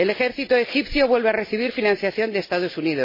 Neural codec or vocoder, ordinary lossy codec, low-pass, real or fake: none; MP3, 24 kbps; 5.4 kHz; real